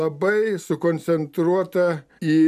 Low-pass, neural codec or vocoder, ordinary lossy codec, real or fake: 14.4 kHz; none; MP3, 96 kbps; real